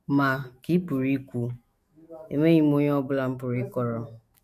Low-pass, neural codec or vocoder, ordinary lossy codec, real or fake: 14.4 kHz; autoencoder, 48 kHz, 128 numbers a frame, DAC-VAE, trained on Japanese speech; MP3, 64 kbps; fake